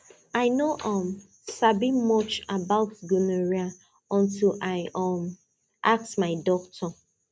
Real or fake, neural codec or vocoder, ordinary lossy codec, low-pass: real; none; none; none